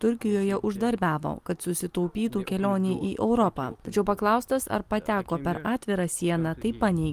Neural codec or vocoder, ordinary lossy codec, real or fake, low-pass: none; Opus, 32 kbps; real; 14.4 kHz